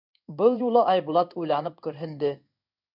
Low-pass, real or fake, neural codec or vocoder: 5.4 kHz; fake; codec, 16 kHz in and 24 kHz out, 1 kbps, XY-Tokenizer